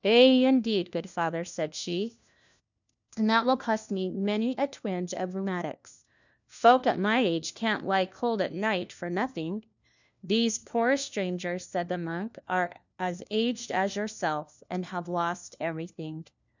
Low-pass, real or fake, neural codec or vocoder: 7.2 kHz; fake; codec, 16 kHz, 1 kbps, FunCodec, trained on LibriTTS, 50 frames a second